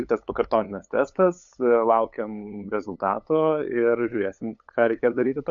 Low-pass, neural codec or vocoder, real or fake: 7.2 kHz; codec, 16 kHz, 8 kbps, FunCodec, trained on LibriTTS, 25 frames a second; fake